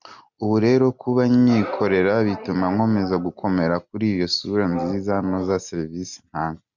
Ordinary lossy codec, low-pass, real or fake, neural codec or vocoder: MP3, 64 kbps; 7.2 kHz; real; none